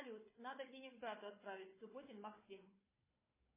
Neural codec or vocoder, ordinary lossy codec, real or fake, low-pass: codec, 16 kHz, 4 kbps, FunCodec, trained on Chinese and English, 50 frames a second; MP3, 16 kbps; fake; 3.6 kHz